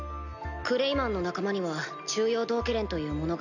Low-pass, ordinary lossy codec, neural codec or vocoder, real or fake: 7.2 kHz; none; none; real